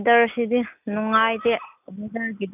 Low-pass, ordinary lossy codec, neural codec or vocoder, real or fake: 3.6 kHz; none; none; real